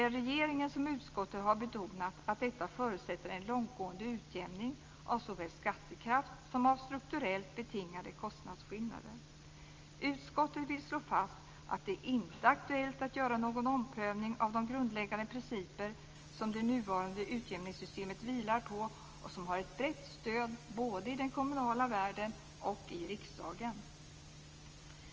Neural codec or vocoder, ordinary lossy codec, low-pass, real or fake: none; Opus, 24 kbps; 7.2 kHz; real